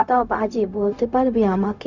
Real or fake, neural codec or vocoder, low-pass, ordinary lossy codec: fake; codec, 16 kHz, 0.4 kbps, LongCat-Audio-Codec; 7.2 kHz; none